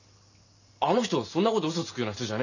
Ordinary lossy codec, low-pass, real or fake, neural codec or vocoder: none; 7.2 kHz; real; none